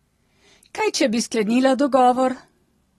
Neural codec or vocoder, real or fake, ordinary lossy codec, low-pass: none; real; AAC, 32 kbps; 19.8 kHz